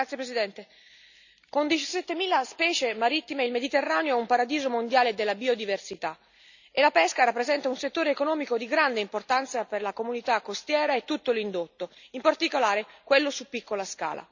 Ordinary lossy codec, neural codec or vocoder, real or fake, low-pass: none; none; real; 7.2 kHz